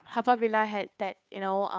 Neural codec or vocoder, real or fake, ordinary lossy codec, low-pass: codec, 16 kHz, 2 kbps, FunCodec, trained on Chinese and English, 25 frames a second; fake; none; none